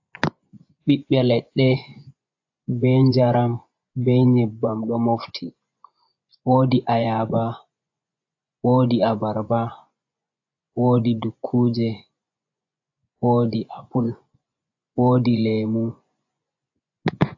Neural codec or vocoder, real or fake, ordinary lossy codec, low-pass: vocoder, 24 kHz, 100 mel bands, Vocos; fake; AAC, 48 kbps; 7.2 kHz